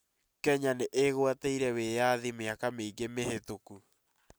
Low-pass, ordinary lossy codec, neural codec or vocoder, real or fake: none; none; none; real